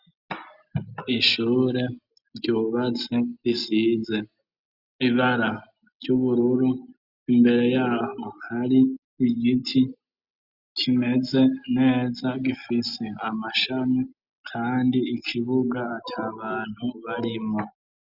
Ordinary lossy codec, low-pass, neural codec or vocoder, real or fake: Opus, 64 kbps; 5.4 kHz; none; real